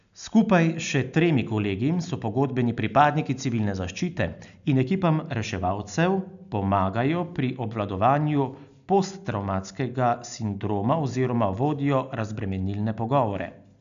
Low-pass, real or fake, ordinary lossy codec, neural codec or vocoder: 7.2 kHz; real; none; none